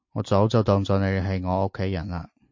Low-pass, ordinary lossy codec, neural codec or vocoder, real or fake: 7.2 kHz; MP3, 48 kbps; none; real